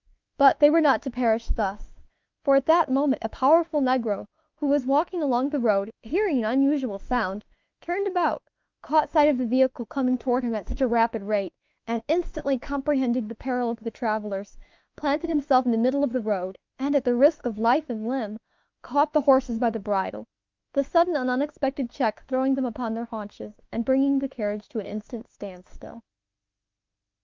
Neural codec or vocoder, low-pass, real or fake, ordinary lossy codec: autoencoder, 48 kHz, 32 numbers a frame, DAC-VAE, trained on Japanese speech; 7.2 kHz; fake; Opus, 24 kbps